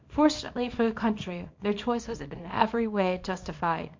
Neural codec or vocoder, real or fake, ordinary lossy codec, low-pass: codec, 24 kHz, 0.9 kbps, WavTokenizer, small release; fake; MP3, 48 kbps; 7.2 kHz